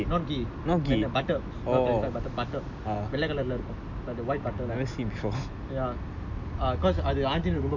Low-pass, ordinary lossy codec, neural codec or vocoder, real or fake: 7.2 kHz; none; none; real